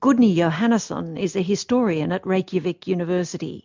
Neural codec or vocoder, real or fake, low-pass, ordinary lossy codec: none; real; 7.2 kHz; MP3, 64 kbps